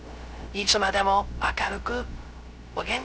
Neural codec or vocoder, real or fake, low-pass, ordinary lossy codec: codec, 16 kHz, 0.3 kbps, FocalCodec; fake; none; none